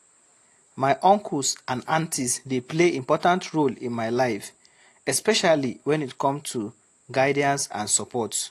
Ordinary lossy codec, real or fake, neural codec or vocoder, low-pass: AAC, 48 kbps; real; none; 14.4 kHz